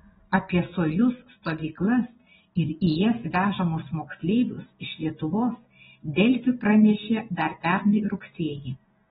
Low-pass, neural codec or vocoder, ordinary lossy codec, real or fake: 7.2 kHz; none; AAC, 16 kbps; real